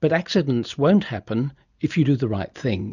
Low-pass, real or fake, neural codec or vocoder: 7.2 kHz; real; none